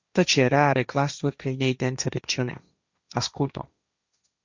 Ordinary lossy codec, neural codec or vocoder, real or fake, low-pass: Opus, 64 kbps; codec, 16 kHz, 1.1 kbps, Voila-Tokenizer; fake; 7.2 kHz